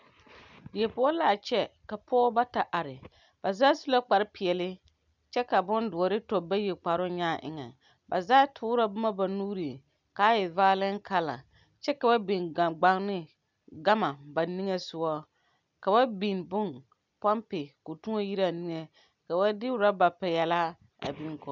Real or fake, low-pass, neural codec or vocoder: real; 7.2 kHz; none